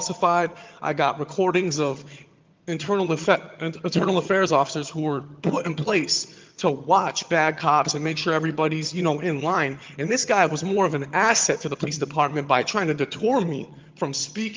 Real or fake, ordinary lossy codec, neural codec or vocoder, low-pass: fake; Opus, 32 kbps; vocoder, 22.05 kHz, 80 mel bands, HiFi-GAN; 7.2 kHz